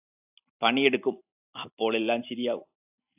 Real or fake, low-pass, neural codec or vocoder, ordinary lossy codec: real; 3.6 kHz; none; AAC, 32 kbps